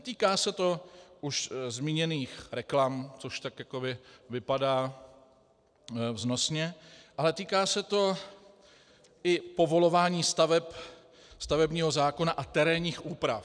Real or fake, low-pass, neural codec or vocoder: real; 9.9 kHz; none